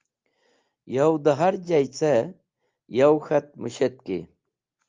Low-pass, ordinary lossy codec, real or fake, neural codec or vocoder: 7.2 kHz; Opus, 24 kbps; real; none